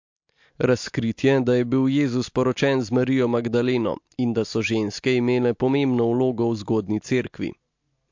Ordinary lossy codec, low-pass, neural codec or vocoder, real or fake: MP3, 48 kbps; 7.2 kHz; none; real